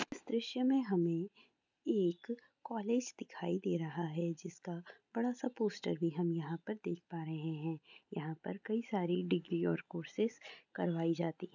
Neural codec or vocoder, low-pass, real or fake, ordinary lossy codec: none; 7.2 kHz; real; none